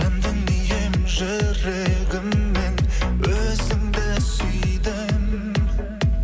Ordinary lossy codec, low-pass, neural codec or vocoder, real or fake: none; none; none; real